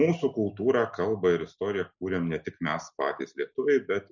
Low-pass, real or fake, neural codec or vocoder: 7.2 kHz; real; none